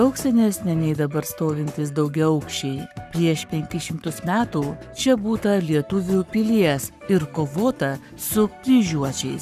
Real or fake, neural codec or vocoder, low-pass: fake; codec, 44.1 kHz, 7.8 kbps, Pupu-Codec; 14.4 kHz